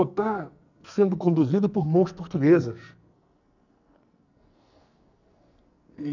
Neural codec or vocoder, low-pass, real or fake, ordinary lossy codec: codec, 44.1 kHz, 2.6 kbps, SNAC; 7.2 kHz; fake; none